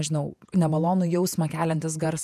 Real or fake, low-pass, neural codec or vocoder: fake; 14.4 kHz; vocoder, 48 kHz, 128 mel bands, Vocos